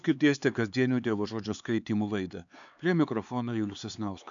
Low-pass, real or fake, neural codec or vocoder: 7.2 kHz; fake; codec, 16 kHz, 4 kbps, X-Codec, HuBERT features, trained on LibriSpeech